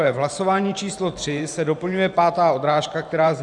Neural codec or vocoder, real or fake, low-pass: vocoder, 44.1 kHz, 128 mel bands every 512 samples, BigVGAN v2; fake; 10.8 kHz